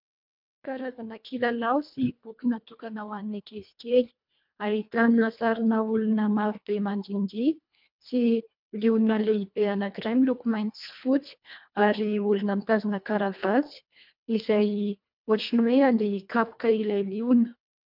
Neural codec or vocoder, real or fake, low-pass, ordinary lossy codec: codec, 24 kHz, 1.5 kbps, HILCodec; fake; 5.4 kHz; MP3, 48 kbps